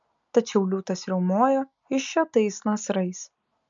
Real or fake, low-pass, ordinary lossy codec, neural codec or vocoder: real; 7.2 kHz; MP3, 64 kbps; none